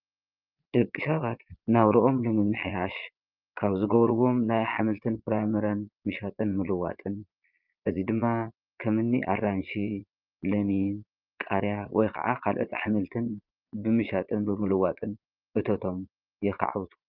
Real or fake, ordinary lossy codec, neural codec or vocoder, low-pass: fake; Opus, 24 kbps; vocoder, 22.05 kHz, 80 mel bands, Vocos; 5.4 kHz